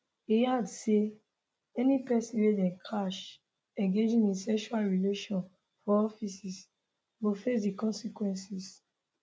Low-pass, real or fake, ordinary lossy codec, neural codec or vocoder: none; real; none; none